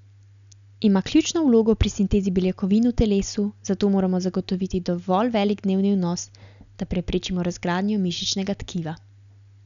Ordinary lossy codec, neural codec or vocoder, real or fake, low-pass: none; none; real; 7.2 kHz